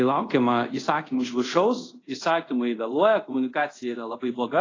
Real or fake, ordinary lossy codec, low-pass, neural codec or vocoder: fake; AAC, 32 kbps; 7.2 kHz; codec, 24 kHz, 0.5 kbps, DualCodec